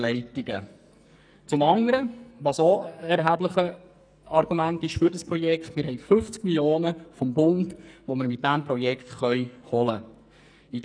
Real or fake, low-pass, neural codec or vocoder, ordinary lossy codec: fake; 9.9 kHz; codec, 44.1 kHz, 2.6 kbps, SNAC; none